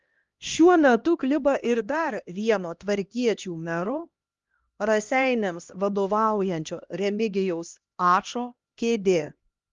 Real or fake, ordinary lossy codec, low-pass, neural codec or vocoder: fake; Opus, 32 kbps; 7.2 kHz; codec, 16 kHz, 1 kbps, X-Codec, HuBERT features, trained on LibriSpeech